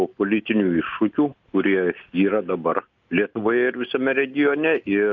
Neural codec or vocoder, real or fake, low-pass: none; real; 7.2 kHz